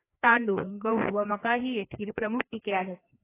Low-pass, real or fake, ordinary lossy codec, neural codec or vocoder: 3.6 kHz; fake; AAC, 16 kbps; codec, 16 kHz, 2 kbps, FreqCodec, larger model